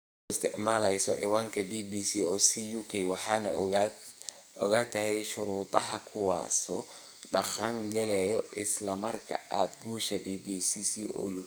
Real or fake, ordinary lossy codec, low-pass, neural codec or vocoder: fake; none; none; codec, 44.1 kHz, 2.6 kbps, SNAC